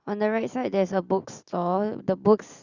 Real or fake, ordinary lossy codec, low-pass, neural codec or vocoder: real; Opus, 64 kbps; 7.2 kHz; none